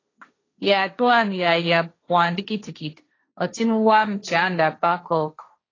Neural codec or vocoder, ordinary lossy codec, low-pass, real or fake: codec, 16 kHz, 1.1 kbps, Voila-Tokenizer; AAC, 32 kbps; 7.2 kHz; fake